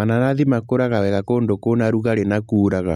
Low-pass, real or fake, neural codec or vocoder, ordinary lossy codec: 19.8 kHz; real; none; MP3, 64 kbps